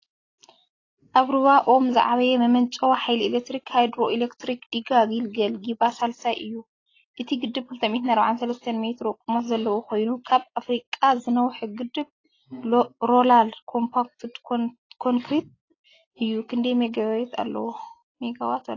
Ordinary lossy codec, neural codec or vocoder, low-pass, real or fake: AAC, 32 kbps; none; 7.2 kHz; real